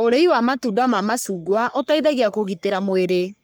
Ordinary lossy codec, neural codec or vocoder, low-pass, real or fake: none; codec, 44.1 kHz, 3.4 kbps, Pupu-Codec; none; fake